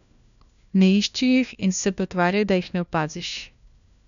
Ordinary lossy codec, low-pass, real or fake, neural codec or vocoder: none; 7.2 kHz; fake; codec, 16 kHz, 1 kbps, FunCodec, trained on LibriTTS, 50 frames a second